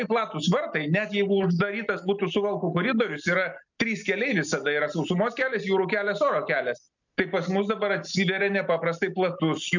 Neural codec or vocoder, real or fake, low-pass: none; real; 7.2 kHz